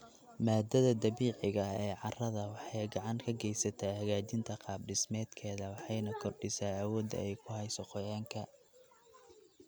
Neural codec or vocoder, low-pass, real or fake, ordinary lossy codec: none; none; real; none